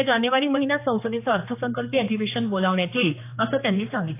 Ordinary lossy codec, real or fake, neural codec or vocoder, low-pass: AAC, 24 kbps; fake; codec, 16 kHz, 2 kbps, X-Codec, HuBERT features, trained on general audio; 3.6 kHz